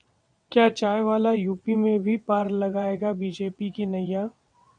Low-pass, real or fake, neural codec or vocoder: 9.9 kHz; fake; vocoder, 22.05 kHz, 80 mel bands, WaveNeXt